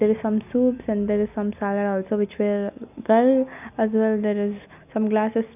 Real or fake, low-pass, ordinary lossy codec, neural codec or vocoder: real; 3.6 kHz; none; none